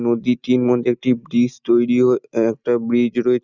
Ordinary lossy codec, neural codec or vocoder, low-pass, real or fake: none; none; 7.2 kHz; real